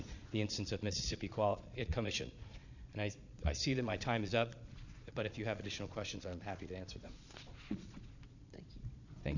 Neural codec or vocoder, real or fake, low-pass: vocoder, 22.05 kHz, 80 mel bands, Vocos; fake; 7.2 kHz